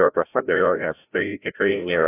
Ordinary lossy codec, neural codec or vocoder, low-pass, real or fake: AAC, 24 kbps; codec, 16 kHz, 0.5 kbps, FreqCodec, larger model; 3.6 kHz; fake